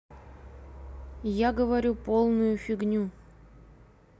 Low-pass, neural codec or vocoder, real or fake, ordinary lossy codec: none; none; real; none